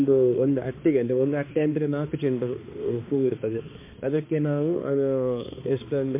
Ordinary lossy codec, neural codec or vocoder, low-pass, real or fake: MP3, 24 kbps; codec, 16 kHz, 2 kbps, X-Codec, HuBERT features, trained on balanced general audio; 3.6 kHz; fake